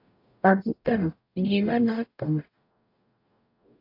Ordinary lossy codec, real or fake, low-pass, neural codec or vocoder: AAC, 32 kbps; fake; 5.4 kHz; codec, 44.1 kHz, 0.9 kbps, DAC